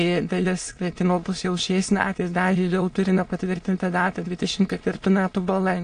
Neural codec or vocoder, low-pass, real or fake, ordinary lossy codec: autoencoder, 22.05 kHz, a latent of 192 numbers a frame, VITS, trained on many speakers; 9.9 kHz; fake; AAC, 48 kbps